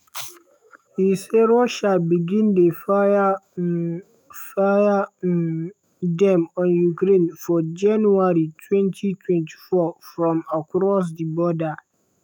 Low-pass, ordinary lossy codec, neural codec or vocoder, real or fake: none; none; autoencoder, 48 kHz, 128 numbers a frame, DAC-VAE, trained on Japanese speech; fake